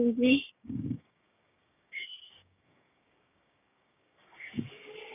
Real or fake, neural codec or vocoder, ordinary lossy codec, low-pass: fake; codec, 16 kHz, 6 kbps, DAC; none; 3.6 kHz